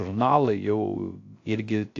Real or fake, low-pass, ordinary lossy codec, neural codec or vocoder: fake; 7.2 kHz; AAC, 48 kbps; codec, 16 kHz, 0.3 kbps, FocalCodec